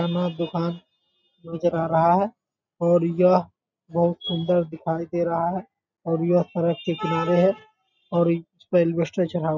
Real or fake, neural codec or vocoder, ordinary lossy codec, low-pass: real; none; none; 7.2 kHz